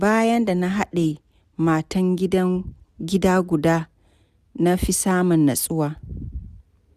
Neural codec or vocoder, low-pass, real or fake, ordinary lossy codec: none; 14.4 kHz; real; none